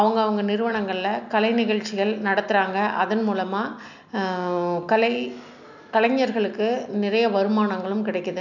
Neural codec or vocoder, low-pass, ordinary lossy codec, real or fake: none; 7.2 kHz; none; real